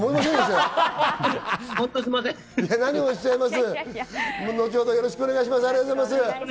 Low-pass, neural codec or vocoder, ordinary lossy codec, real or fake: none; none; none; real